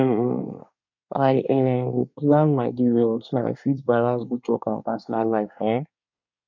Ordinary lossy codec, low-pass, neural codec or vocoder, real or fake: none; 7.2 kHz; codec, 24 kHz, 1 kbps, SNAC; fake